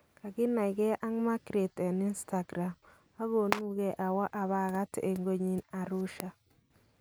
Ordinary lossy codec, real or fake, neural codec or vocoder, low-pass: none; real; none; none